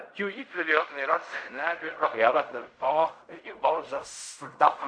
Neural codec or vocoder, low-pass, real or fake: codec, 16 kHz in and 24 kHz out, 0.4 kbps, LongCat-Audio-Codec, fine tuned four codebook decoder; 9.9 kHz; fake